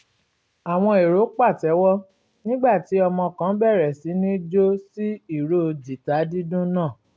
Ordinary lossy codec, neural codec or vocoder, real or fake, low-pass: none; none; real; none